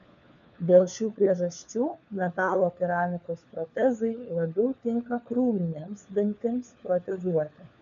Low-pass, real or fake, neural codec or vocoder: 7.2 kHz; fake; codec, 16 kHz, 4 kbps, FunCodec, trained on LibriTTS, 50 frames a second